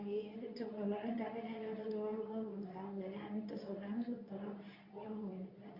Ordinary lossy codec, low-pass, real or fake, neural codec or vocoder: Opus, 64 kbps; 5.4 kHz; fake; codec, 24 kHz, 0.9 kbps, WavTokenizer, medium speech release version 2